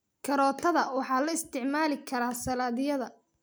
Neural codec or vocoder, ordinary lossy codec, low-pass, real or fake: none; none; none; real